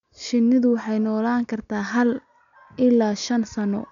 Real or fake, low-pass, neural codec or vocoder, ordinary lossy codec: real; 7.2 kHz; none; none